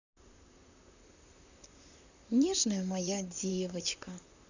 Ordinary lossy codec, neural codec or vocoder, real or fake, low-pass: none; vocoder, 44.1 kHz, 128 mel bands, Pupu-Vocoder; fake; 7.2 kHz